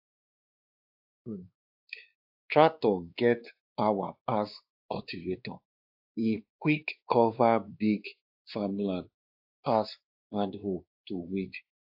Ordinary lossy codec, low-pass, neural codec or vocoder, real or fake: AAC, 48 kbps; 5.4 kHz; codec, 16 kHz, 2 kbps, X-Codec, WavLM features, trained on Multilingual LibriSpeech; fake